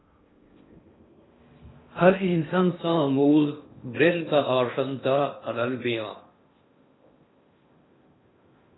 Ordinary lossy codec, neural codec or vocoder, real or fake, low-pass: AAC, 16 kbps; codec, 16 kHz in and 24 kHz out, 0.6 kbps, FocalCodec, streaming, 2048 codes; fake; 7.2 kHz